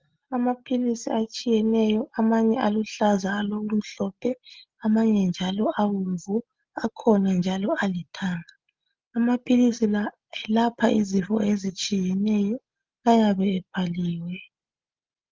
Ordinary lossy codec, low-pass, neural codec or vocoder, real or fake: Opus, 32 kbps; 7.2 kHz; none; real